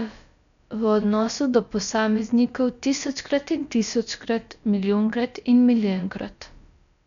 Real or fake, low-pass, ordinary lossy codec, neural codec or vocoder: fake; 7.2 kHz; none; codec, 16 kHz, about 1 kbps, DyCAST, with the encoder's durations